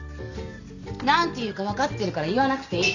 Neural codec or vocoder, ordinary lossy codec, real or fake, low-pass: none; none; real; 7.2 kHz